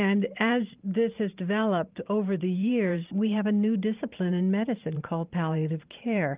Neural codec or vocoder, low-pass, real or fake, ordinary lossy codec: vocoder, 44.1 kHz, 128 mel bands every 512 samples, BigVGAN v2; 3.6 kHz; fake; Opus, 24 kbps